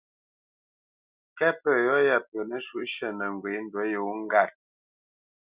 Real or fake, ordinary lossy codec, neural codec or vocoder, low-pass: real; Opus, 64 kbps; none; 3.6 kHz